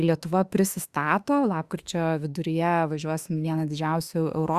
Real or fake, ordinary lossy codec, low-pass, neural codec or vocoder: fake; Opus, 64 kbps; 14.4 kHz; autoencoder, 48 kHz, 32 numbers a frame, DAC-VAE, trained on Japanese speech